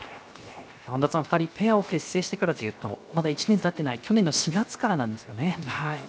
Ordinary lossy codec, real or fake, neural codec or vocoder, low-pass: none; fake; codec, 16 kHz, 0.7 kbps, FocalCodec; none